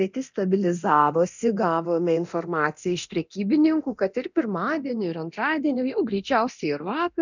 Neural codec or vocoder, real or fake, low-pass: codec, 24 kHz, 0.9 kbps, DualCodec; fake; 7.2 kHz